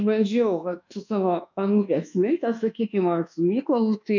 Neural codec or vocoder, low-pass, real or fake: codec, 24 kHz, 1.2 kbps, DualCodec; 7.2 kHz; fake